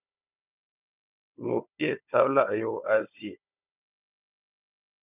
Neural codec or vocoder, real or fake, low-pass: codec, 16 kHz, 4 kbps, FunCodec, trained on Chinese and English, 50 frames a second; fake; 3.6 kHz